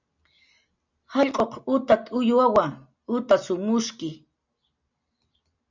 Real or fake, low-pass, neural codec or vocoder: real; 7.2 kHz; none